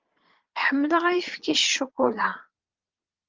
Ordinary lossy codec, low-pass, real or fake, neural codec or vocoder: Opus, 32 kbps; 7.2 kHz; fake; vocoder, 22.05 kHz, 80 mel bands, Vocos